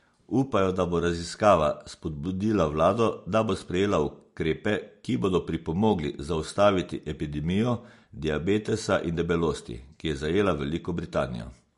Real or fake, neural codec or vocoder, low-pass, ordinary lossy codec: real; none; 10.8 kHz; MP3, 48 kbps